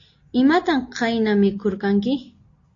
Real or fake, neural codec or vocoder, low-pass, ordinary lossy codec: real; none; 7.2 kHz; AAC, 48 kbps